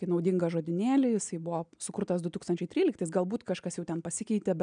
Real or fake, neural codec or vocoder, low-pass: real; none; 9.9 kHz